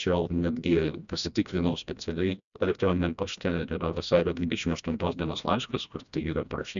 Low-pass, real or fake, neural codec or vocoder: 7.2 kHz; fake; codec, 16 kHz, 1 kbps, FreqCodec, smaller model